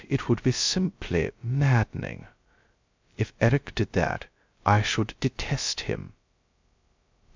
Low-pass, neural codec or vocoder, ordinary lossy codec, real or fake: 7.2 kHz; codec, 16 kHz, 0.2 kbps, FocalCodec; MP3, 64 kbps; fake